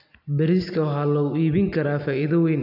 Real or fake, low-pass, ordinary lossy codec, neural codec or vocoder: real; 5.4 kHz; none; none